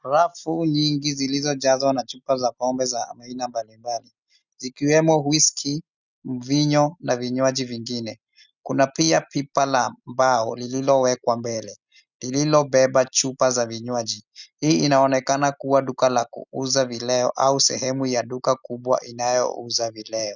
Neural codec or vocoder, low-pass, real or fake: none; 7.2 kHz; real